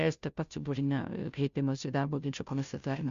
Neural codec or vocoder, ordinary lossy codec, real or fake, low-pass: codec, 16 kHz, 0.5 kbps, FunCodec, trained on Chinese and English, 25 frames a second; Opus, 64 kbps; fake; 7.2 kHz